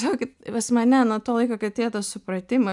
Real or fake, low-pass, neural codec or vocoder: real; 10.8 kHz; none